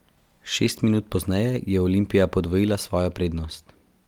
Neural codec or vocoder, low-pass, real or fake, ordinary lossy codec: none; 19.8 kHz; real; Opus, 32 kbps